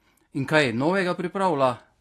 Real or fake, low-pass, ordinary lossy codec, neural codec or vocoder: real; 14.4 kHz; AAC, 48 kbps; none